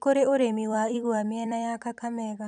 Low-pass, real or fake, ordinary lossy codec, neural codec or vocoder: 10.8 kHz; fake; none; vocoder, 44.1 kHz, 128 mel bands every 256 samples, BigVGAN v2